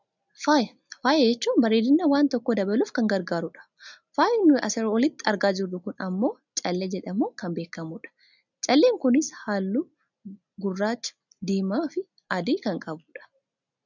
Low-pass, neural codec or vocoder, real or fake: 7.2 kHz; none; real